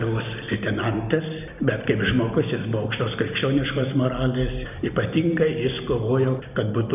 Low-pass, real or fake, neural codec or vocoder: 3.6 kHz; real; none